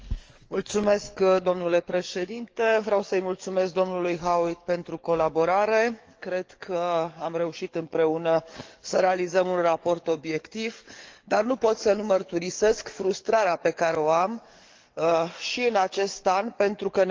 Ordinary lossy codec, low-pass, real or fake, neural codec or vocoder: Opus, 24 kbps; 7.2 kHz; fake; codec, 44.1 kHz, 7.8 kbps, DAC